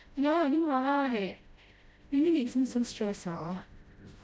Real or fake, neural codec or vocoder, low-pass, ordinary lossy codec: fake; codec, 16 kHz, 0.5 kbps, FreqCodec, smaller model; none; none